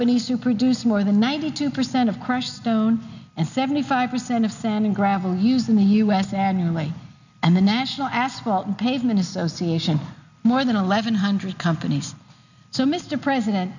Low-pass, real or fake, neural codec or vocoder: 7.2 kHz; real; none